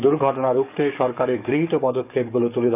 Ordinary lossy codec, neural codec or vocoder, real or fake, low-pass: none; codec, 44.1 kHz, 7.8 kbps, DAC; fake; 3.6 kHz